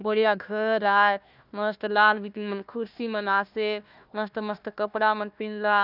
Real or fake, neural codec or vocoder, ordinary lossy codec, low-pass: fake; codec, 16 kHz, 1 kbps, FunCodec, trained on Chinese and English, 50 frames a second; none; 5.4 kHz